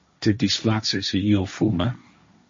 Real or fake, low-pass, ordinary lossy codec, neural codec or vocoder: fake; 7.2 kHz; MP3, 32 kbps; codec, 16 kHz, 1.1 kbps, Voila-Tokenizer